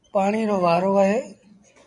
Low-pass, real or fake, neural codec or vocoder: 10.8 kHz; fake; vocoder, 24 kHz, 100 mel bands, Vocos